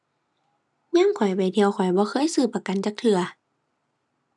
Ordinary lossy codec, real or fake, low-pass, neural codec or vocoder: none; real; 10.8 kHz; none